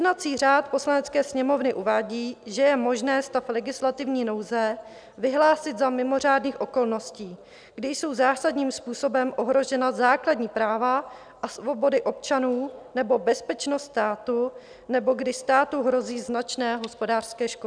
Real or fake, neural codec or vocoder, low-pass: real; none; 9.9 kHz